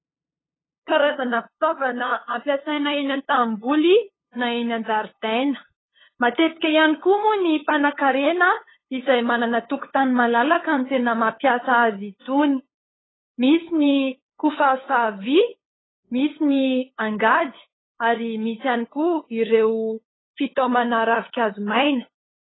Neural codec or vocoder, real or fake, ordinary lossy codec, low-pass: codec, 16 kHz, 8 kbps, FunCodec, trained on LibriTTS, 25 frames a second; fake; AAC, 16 kbps; 7.2 kHz